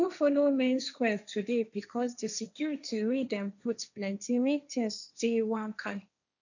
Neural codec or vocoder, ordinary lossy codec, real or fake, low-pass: codec, 16 kHz, 1.1 kbps, Voila-Tokenizer; none; fake; 7.2 kHz